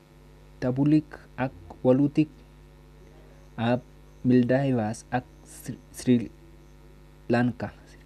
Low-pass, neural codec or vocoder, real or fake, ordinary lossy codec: 14.4 kHz; none; real; none